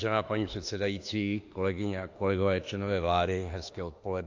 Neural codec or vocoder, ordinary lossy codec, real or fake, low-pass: autoencoder, 48 kHz, 32 numbers a frame, DAC-VAE, trained on Japanese speech; AAC, 48 kbps; fake; 7.2 kHz